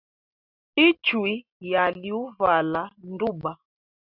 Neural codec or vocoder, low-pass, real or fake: none; 5.4 kHz; real